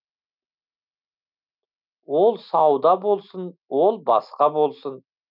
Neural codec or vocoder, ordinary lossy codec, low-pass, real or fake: none; none; 5.4 kHz; real